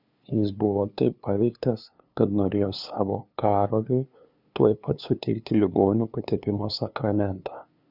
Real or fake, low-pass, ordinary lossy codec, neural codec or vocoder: fake; 5.4 kHz; Opus, 64 kbps; codec, 16 kHz, 2 kbps, FunCodec, trained on LibriTTS, 25 frames a second